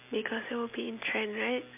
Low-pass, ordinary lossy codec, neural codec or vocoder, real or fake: 3.6 kHz; MP3, 32 kbps; none; real